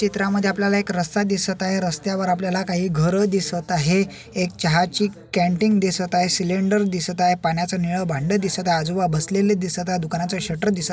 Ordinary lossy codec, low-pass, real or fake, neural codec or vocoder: none; none; real; none